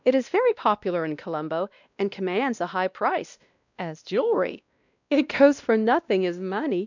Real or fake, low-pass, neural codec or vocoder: fake; 7.2 kHz; codec, 16 kHz, 1 kbps, X-Codec, WavLM features, trained on Multilingual LibriSpeech